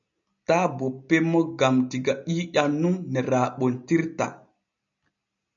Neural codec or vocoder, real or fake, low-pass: none; real; 7.2 kHz